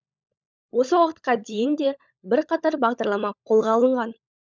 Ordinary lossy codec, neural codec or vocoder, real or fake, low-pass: none; codec, 16 kHz, 16 kbps, FunCodec, trained on LibriTTS, 50 frames a second; fake; none